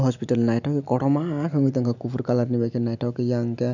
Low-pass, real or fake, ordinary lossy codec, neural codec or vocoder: 7.2 kHz; real; none; none